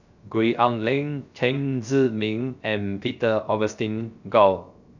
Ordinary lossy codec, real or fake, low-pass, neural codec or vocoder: none; fake; 7.2 kHz; codec, 16 kHz, 0.3 kbps, FocalCodec